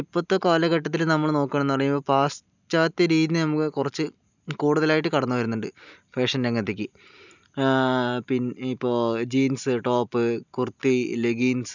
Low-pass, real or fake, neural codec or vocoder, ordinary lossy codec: 7.2 kHz; real; none; none